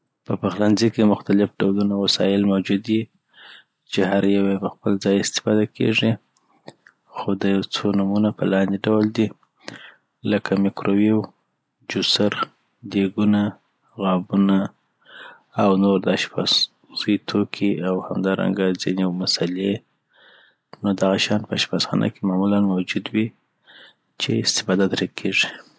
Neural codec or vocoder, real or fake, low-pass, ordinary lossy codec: none; real; none; none